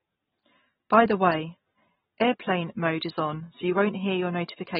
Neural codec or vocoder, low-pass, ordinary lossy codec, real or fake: none; 10.8 kHz; AAC, 16 kbps; real